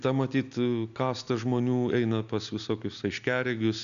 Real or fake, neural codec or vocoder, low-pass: real; none; 7.2 kHz